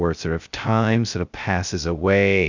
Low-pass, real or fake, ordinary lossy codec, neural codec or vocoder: 7.2 kHz; fake; Opus, 64 kbps; codec, 16 kHz, 0.2 kbps, FocalCodec